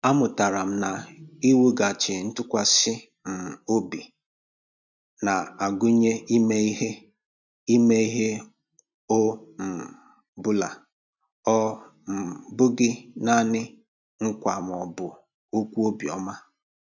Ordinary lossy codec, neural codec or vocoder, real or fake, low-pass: none; none; real; 7.2 kHz